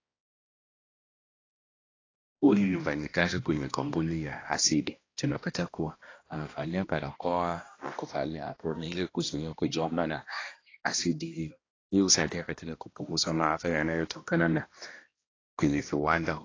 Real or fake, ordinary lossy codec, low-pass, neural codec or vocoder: fake; AAC, 32 kbps; 7.2 kHz; codec, 16 kHz, 1 kbps, X-Codec, HuBERT features, trained on balanced general audio